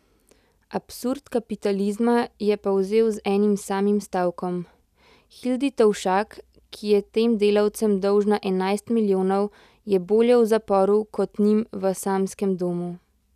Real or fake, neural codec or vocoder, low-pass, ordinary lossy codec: real; none; 14.4 kHz; none